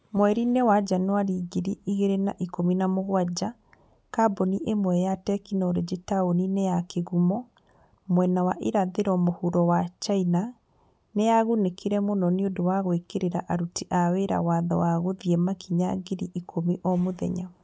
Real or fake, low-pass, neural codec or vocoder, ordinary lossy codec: real; none; none; none